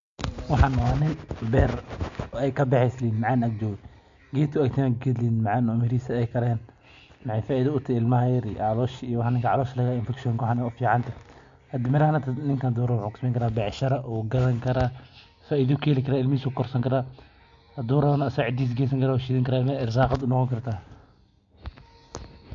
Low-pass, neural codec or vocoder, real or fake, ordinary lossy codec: 7.2 kHz; none; real; MP3, 48 kbps